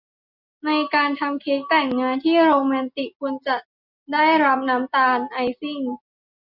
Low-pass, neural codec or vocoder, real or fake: 5.4 kHz; none; real